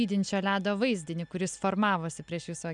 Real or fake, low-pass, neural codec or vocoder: real; 10.8 kHz; none